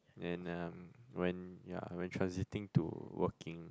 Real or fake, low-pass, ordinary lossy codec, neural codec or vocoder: real; none; none; none